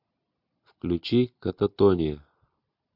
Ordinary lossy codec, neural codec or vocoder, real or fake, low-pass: MP3, 48 kbps; none; real; 5.4 kHz